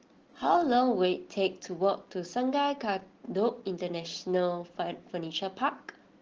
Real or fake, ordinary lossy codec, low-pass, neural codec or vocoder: real; Opus, 16 kbps; 7.2 kHz; none